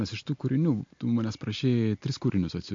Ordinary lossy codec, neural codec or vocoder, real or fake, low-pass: MP3, 48 kbps; none; real; 7.2 kHz